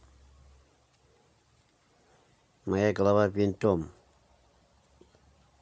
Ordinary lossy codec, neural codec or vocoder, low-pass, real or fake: none; none; none; real